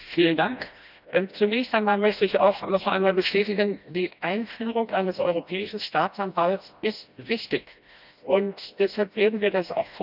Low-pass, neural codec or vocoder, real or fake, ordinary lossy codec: 5.4 kHz; codec, 16 kHz, 1 kbps, FreqCodec, smaller model; fake; none